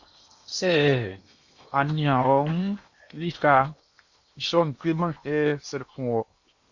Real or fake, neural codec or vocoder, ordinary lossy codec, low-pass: fake; codec, 16 kHz in and 24 kHz out, 0.8 kbps, FocalCodec, streaming, 65536 codes; Opus, 64 kbps; 7.2 kHz